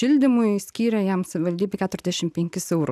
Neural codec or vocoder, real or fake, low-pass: none; real; 14.4 kHz